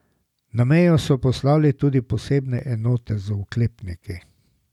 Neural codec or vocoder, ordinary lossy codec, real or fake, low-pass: none; none; real; 19.8 kHz